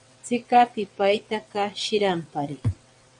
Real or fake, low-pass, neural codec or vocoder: fake; 9.9 kHz; vocoder, 22.05 kHz, 80 mel bands, WaveNeXt